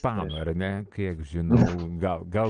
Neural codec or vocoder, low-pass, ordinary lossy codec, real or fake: none; 9.9 kHz; Opus, 24 kbps; real